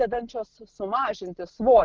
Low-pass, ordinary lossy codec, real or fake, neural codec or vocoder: 7.2 kHz; Opus, 16 kbps; real; none